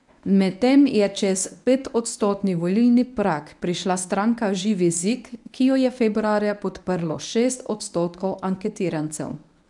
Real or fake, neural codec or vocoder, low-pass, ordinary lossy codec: fake; codec, 24 kHz, 0.9 kbps, WavTokenizer, medium speech release version 1; 10.8 kHz; none